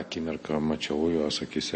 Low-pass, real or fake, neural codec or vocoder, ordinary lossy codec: 9.9 kHz; real; none; MP3, 32 kbps